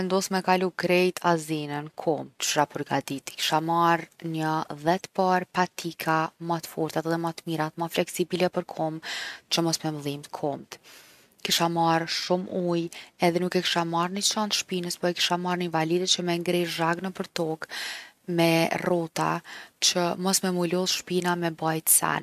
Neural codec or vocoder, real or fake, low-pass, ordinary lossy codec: none; real; 14.4 kHz; MP3, 96 kbps